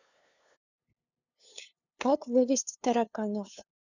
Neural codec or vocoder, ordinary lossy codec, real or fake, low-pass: codec, 16 kHz, 2 kbps, FunCodec, trained on LibriTTS, 25 frames a second; none; fake; 7.2 kHz